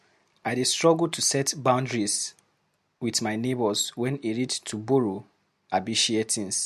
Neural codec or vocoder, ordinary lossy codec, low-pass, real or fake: none; MP3, 64 kbps; 14.4 kHz; real